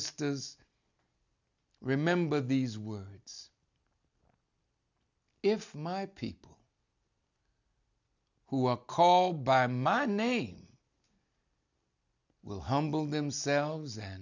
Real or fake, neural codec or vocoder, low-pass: real; none; 7.2 kHz